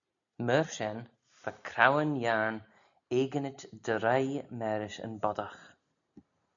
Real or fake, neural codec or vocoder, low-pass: real; none; 7.2 kHz